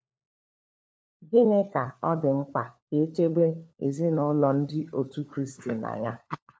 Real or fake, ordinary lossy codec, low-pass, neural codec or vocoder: fake; none; none; codec, 16 kHz, 4 kbps, FunCodec, trained on LibriTTS, 50 frames a second